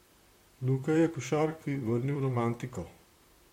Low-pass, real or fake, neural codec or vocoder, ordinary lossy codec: 19.8 kHz; fake; vocoder, 44.1 kHz, 128 mel bands, Pupu-Vocoder; MP3, 64 kbps